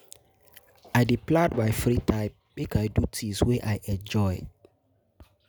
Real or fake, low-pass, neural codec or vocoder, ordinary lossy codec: real; none; none; none